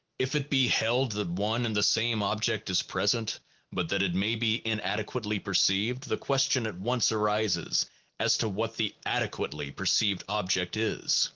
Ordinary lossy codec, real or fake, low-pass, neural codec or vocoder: Opus, 16 kbps; real; 7.2 kHz; none